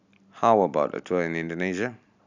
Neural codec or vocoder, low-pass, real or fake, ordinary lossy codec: none; 7.2 kHz; real; none